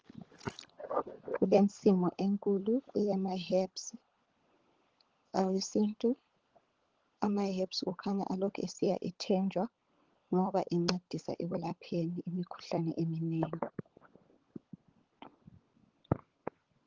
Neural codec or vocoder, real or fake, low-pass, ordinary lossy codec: codec, 16 kHz, 16 kbps, FunCodec, trained on LibriTTS, 50 frames a second; fake; 7.2 kHz; Opus, 16 kbps